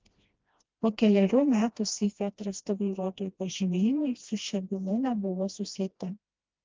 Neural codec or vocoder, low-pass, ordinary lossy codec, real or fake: codec, 16 kHz, 1 kbps, FreqCodec, smaller model; 7.2 kHz; Opus, 32 kbps; fake